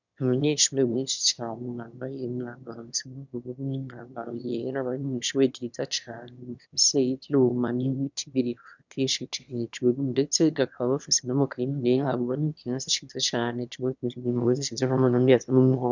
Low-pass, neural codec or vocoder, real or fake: 7.2 kHz; autoencoder, 22.05 kHz, a latent of 192 numbers a frame, VITS, trained on one speaker; fake